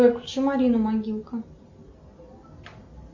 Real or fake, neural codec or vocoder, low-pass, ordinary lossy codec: real; none; 7.2 kHz; MP3, 64 kbps